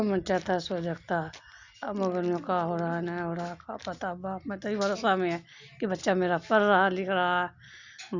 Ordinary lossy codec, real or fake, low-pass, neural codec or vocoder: Opus, 64 kbps; real; 7.2 kHz; none